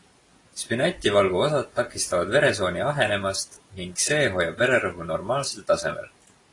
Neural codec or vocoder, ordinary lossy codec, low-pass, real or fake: none; AAC, 32 kbps; 10.8 kHz; real